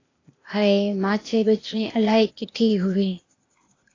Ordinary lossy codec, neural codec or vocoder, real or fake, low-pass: AAC, 32 kbps; codec, 16 kHz, 0.8 kbps, ZipCodec; fake; 7.2 kHz